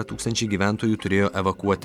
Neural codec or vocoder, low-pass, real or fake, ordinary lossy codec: none; 19.8 kHz; real; MP3, 96 kbps